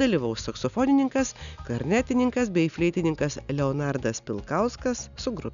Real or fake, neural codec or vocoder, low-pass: real; none; 7.2 kHz